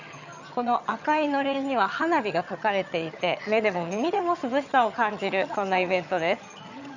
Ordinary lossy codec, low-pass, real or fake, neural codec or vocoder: none; 7.2 kHz; fake; vocoder, 22.05 kHz, 80 mel bands, HiFi-GAN